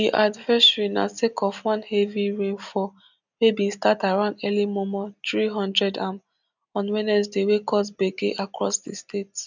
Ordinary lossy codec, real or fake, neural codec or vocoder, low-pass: none; real; none; 7.2 kHz